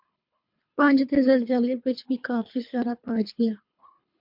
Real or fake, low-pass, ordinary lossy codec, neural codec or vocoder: fake; 5.4 kHz; MP3, 48 kbps; codec, 24 kHz, 3 kbps, HILCodec